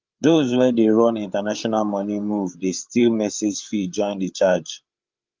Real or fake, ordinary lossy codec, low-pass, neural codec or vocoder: fake; Opus, 24 kbps; 7.2 kHz; codec, 16 kHz, 8 kbps, FreqCodec, larger model